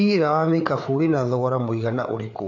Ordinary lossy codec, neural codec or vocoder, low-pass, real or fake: none; codec, 16 kHz, 4 kbps, FreqCodec, larger model; 7.2 kHz; fake